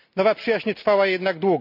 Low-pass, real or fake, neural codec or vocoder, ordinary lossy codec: 5.4 kHz; real; none; none